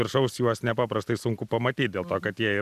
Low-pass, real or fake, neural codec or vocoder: 14.4 kHz; real; none